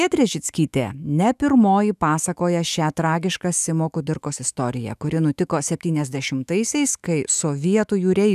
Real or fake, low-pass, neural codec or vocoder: fake; 14.4 kHz; autoencoder, 48 kHz, 128 numbers a frame, DAC-VAE, trained on Japanese speech